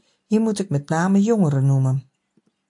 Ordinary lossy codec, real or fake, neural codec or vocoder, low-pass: MP3, 64 kbps; real; none; 10.8 kHz